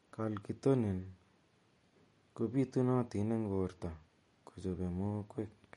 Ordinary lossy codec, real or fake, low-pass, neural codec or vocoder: MP3, 48 kbps; real; 14.4 kHz; none